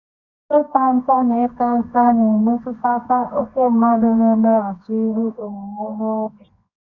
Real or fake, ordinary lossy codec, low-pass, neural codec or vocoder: fake; none; 7.2 kHz; codec, 24 kHz, 0.9 kbps, WavTokenizer, medium music audio release